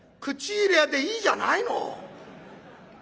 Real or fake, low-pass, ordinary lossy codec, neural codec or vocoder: real; none; none; none